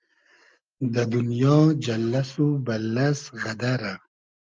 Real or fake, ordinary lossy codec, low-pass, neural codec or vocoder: real; Opus, 32 kbps; 7.2 kHz; none